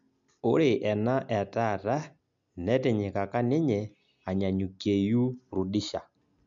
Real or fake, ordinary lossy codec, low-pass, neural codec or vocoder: real; MP3, 64 kbps; 7.2 kHz; none